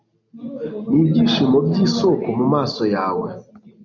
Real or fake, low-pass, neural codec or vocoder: real; 7.2 kHz; none